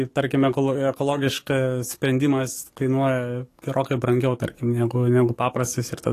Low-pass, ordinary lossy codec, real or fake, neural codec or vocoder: 14.4 kHz; AAC, 48 kbps; fake; codec, 44.1 kHz, 7.8 kbps, DAC